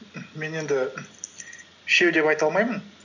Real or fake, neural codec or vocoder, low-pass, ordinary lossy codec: real; none; 7.2 kHz; none